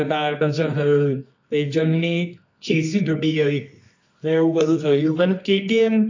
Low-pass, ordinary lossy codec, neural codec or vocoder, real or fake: 7.2 kHz; AAC, 48 kbps; codec, 24 kHz, 0.9 kbps, WavTokenizer, medium music audio release; fake